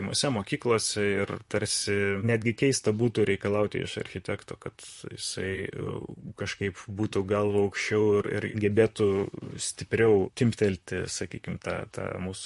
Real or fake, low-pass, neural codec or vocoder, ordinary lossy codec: fake; 14.4 kHz; vocoder, 44.1 kHz, 128 mel bands, Pupu-Vocoder; MP3, 48 kbps